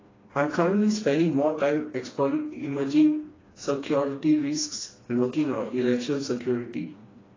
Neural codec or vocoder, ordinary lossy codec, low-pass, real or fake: codec, 16 kHz, 2 kbps, FreqCodec, smaller model; AAC, 32 kbps; 7.2 kHz; fake